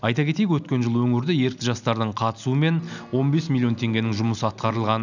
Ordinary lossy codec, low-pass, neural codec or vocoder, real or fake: none; 7.2 kHz; none; real